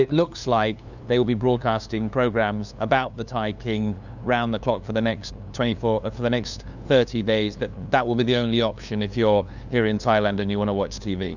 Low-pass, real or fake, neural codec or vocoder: 7.2 kHz; fake; codec, 16 kHz, 2 kbps, FunCodec, trained on LibriTTS, 25 frames a second